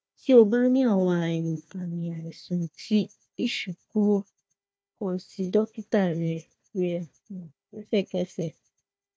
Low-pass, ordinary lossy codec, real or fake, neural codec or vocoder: none; none; fake; codec, 16 kHz, 1 kbps, FunCodec, trained on Chinese and English, 50 frames a second